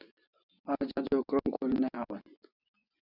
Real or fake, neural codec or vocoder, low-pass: fake; vocoder, 24 kHz, 100 mel bands, Vocos; 5.4 kHz